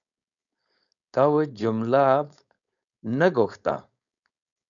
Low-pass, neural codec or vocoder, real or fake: 7.2 kHz; codec, 16 kHz, 4.8 kbps, FACodec; fake